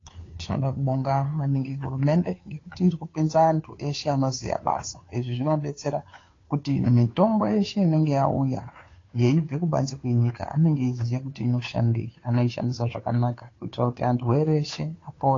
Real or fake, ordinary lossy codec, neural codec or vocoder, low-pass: fake; AAC, 32 kbps; codec, 16 kHz, 2 kbps, FunCodec, trained on Chinese and English, 25 frames a second; 7.2 kHz